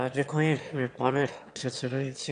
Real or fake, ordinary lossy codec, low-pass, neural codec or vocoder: fake; AAC, 64 kbps; 9.9 kHz; autoencoder, 22.05 kHz, a latent of 192 numbers a frame, VITS, trained on one speaker